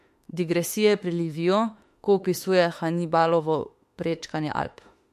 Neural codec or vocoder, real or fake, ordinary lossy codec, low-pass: autoencoder, 48 kHz, 32 numbers a frame, DAC-VAE, trained on Japanese speech; fake; MP3, 64 kbps; 14.4 kHz